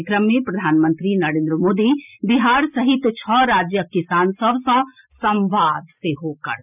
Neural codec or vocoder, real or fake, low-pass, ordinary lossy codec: none; real; 3.6 kHz; none